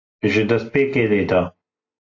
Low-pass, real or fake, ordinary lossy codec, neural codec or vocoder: 7.2 kHz; real; AAC, 48 kbps; none